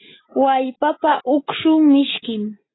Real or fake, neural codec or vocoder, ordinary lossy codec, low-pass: real; none; AAC, 16 kbps; 7.2 kHz